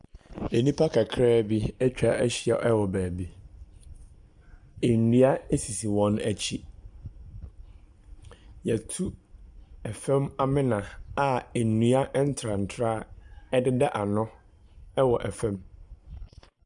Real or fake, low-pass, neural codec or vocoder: real; 10.8 kHz; none